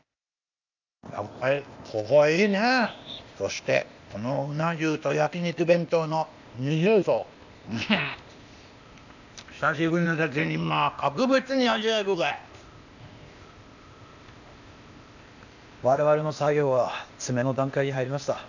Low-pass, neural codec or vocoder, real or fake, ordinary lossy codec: 7.2 kHz; codec, 16 kHz, 0.8 kbps, ZipCodec; fake; none